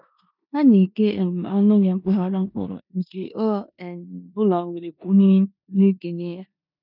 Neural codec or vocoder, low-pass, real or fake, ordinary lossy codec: codec, 16 kHz in and 24 kHz out, 0.9 kbps, LongCat-Audio-Codec, four codebook decoder; 5.4 kHz; fake; none